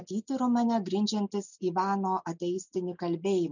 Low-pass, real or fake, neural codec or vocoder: 7.2 kHz; real; none